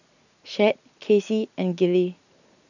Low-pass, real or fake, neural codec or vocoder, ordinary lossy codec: 7.2 kHz; real; none; none